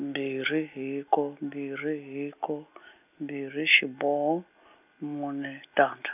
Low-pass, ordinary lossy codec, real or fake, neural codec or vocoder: 3.6 kHz; none; real; none